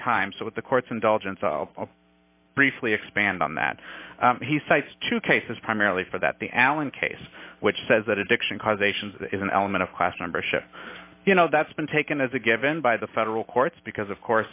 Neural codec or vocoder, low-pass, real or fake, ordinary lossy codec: none; 3.6 kHz; real; AAC, 32 kbps